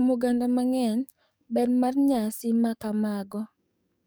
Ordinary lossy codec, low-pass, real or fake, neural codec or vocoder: none; none; fake; codec, 44.1 kHz, 7.8 kbps, DAC